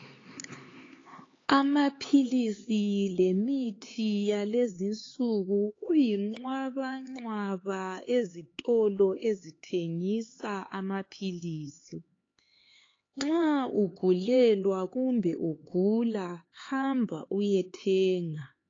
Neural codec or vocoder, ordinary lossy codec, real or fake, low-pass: codec, 16 kHz, 4 kbps, X-Codec, HuBERT features, trained on LibriSpeech; AAC, 32 kbps; fake; 7.2 kHz